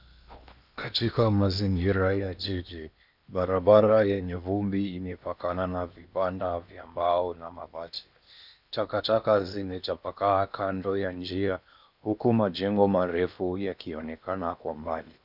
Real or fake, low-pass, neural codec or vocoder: fake; 5.4 kHz; codec, 16 kHz in and 24 kHz out, 0.8 kbps, FocalCodec, streaming, 65536 codes